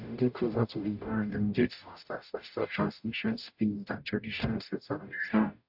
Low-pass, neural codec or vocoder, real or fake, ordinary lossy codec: 5.4 kHz; codec, 44.1 kHz, 0.9 kbps, DAC; fake; AAC, 48 kbps